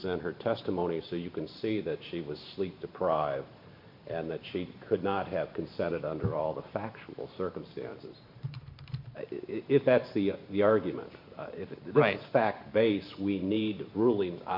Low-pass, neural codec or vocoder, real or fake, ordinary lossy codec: 5.4 kHz; vocoder, 44.1 kHz, 128 mel bands every 512 samples, BigVGAN v2; fake; MP3, 48 kbps